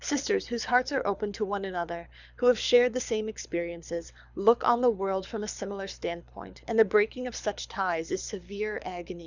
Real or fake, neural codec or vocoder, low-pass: fake; codec, 24 kHz, 6 kbps, HILCodec; 7.2 kHz